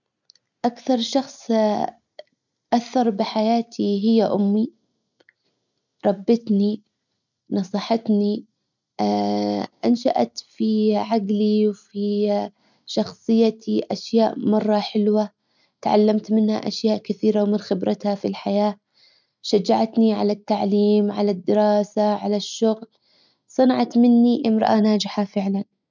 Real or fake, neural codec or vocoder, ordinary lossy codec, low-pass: real; none; none; 7.2 kHz